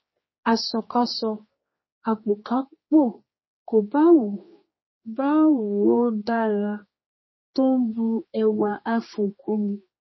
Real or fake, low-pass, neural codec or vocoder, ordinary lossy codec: fake; 7.2 kHz; codec, 16 kHz, 2 kbps, X-Codec, HuBERT features, trained on general audio; MP3, 24 kbps